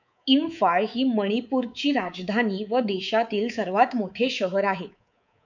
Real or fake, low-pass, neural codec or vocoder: fake; 7.2 kHz; codec, 24 kHz, 3.1 kbps, DualCodec